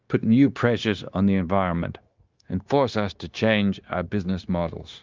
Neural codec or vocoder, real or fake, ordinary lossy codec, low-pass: codec, 16 kHz, 0.9 kbps, LongCat-Audio-Codec; fake; Opus, 24 kbps; 7.2 kHz